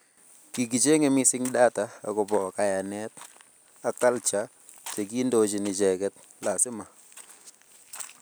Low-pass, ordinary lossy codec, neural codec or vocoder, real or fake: none; none; none; real